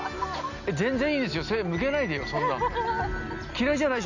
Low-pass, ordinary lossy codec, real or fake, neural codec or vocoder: 7.2 kHz; none; real; none